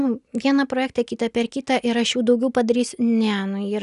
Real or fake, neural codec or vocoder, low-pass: real; none; 10.8 kHz